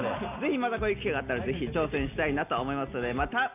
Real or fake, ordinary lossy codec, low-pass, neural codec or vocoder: real; none; 3.6 kHz; none